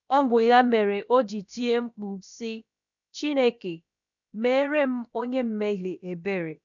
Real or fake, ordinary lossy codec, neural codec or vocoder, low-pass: fake; none; codec, 16 kHz, about 1 kbps, DyCAST, with the encoder's durations; 7.2 kHz